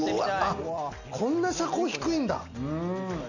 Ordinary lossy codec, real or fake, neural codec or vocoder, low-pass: none; real; none; 7.2 kHz